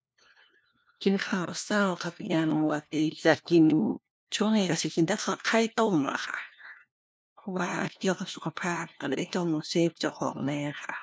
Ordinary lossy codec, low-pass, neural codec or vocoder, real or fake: none; none; codec, 16 kHz, 1 kbps, FunCodec, trained on LibriTTS, 50 frames a second; fake